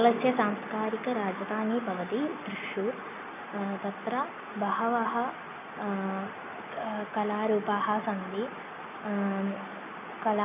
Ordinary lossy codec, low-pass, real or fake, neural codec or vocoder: none; 3.6 kHz; real; none